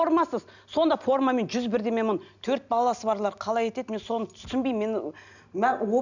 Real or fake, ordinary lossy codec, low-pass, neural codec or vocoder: real; none; 7.2 kHz; none